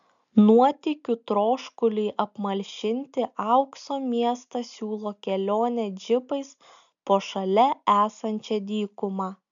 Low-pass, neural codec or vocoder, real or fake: 7.2 kHz; none; real